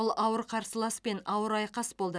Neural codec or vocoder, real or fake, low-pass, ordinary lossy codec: none; real; none; none